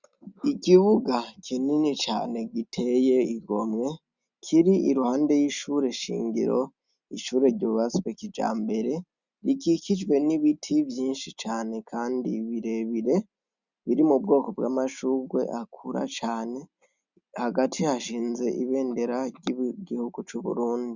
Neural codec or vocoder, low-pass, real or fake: none; 7.2 kHz; real